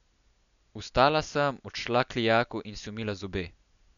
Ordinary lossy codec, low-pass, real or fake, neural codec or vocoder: none; 7.2 kHz; real; none